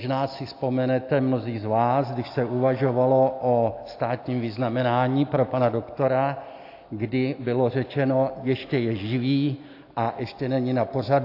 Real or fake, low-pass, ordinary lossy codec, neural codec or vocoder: real; 5.4 kHz; AAC, 32 kbps; none